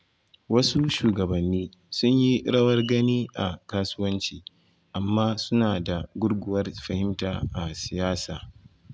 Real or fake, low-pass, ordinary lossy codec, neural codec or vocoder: real; none; none; none